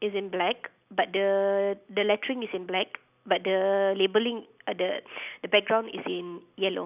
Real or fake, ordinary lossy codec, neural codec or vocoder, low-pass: real; none; none; 3.6 kHz